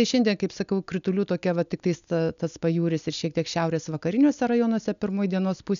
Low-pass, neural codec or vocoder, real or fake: 7.2 kHz; none; real